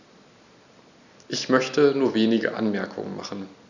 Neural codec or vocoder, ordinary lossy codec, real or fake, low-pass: none; none; real; 7.2 kHz